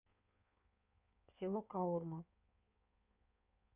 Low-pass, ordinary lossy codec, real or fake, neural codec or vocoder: 3.6 kHz; none; fake; codec, 16 kHz in and 24 kHz out, 1.1 kbps, FireRedTTS-2 codec